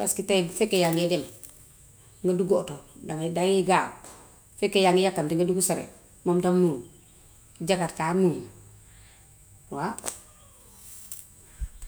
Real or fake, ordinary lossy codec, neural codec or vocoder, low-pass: fake; none; autoencoder, 48 kHz, 128 numbers a frame, DAC-VAE, trained on Japanese speech; none